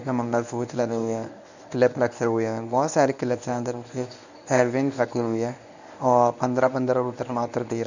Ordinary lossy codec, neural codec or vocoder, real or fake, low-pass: none; codec, 24 kHz, 0.9 kbps, WavTokenizer, medium speech release version 1; fake; 7.2 kHz